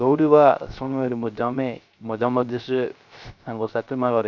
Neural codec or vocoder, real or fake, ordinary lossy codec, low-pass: codec, 16 kHz, 0.3 kbps, FocalCodec; fake; none; 7.2 kHz